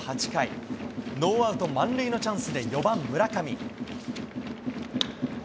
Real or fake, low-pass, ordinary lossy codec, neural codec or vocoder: real; none; none; none